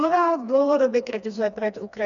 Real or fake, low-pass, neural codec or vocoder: fake; 7.2 kHz; codec, 16 kHz, 2 kbps, FreqCodec, smaller model